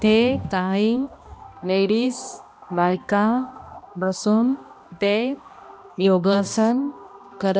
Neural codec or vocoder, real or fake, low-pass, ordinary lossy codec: codec, 16 kHz, 1 kbps, X-Codec, HuBERT features, trained on balanced general audio; fake; none; none